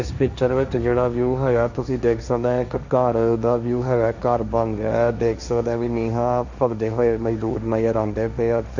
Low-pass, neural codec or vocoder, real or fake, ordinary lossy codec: 7.2 kHz; codec, 16 kHz, 1.1 kbps, Voila-Tokenizer; fake; none